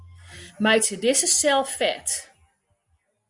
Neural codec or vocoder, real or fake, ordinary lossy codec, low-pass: none; real; Opus, 64 kbps; 10.8 kHz